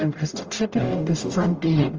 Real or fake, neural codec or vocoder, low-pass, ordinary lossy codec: fake; codec, 44.1 kHz, 0.9 kbps, DAC; 7.2 kHz; Opus, 24 kbps